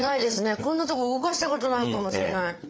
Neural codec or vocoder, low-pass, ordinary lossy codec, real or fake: codec, 16 kHz, 8 kbps, FreqCodec, larger model; none; none; fake